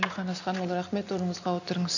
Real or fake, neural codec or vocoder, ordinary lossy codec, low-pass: real; none; none; 7.2 kHz